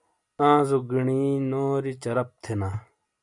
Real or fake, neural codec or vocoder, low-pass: real; none; 10.8 kHz